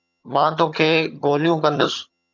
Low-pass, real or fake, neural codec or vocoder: 7.2 kHz; fake; vocoder, 22.05 kHz, 80 mel bands, HiFi-GAN